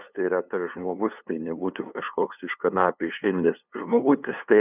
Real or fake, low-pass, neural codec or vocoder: fake; 3.6 kHz; codec, 16 kHz, 2 kbps, FunCodec, trained on LibriTTS, 25 frames a second